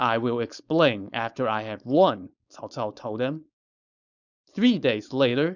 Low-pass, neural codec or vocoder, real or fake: 7.2 kHz; codec, 16 kHz, 4.8 kbps, FACodec; fake